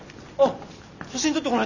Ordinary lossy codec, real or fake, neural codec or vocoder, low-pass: none; real; none; 7.2 kHz